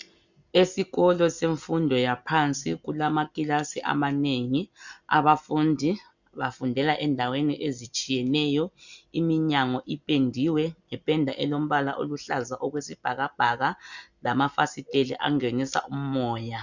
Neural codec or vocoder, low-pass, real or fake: none; 7.2 kHz; real